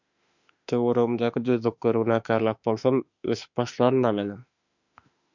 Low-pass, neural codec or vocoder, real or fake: 7.2 kHz; autoencoder, 48 kHz, 32 numbers a frame, DAC-VAE, trained on Japanese speech; fake